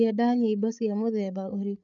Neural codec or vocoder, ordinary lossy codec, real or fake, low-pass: codec, 16 kHz, 8 kbps, FreqCodec, larger model; none; fake; 7.2 kHz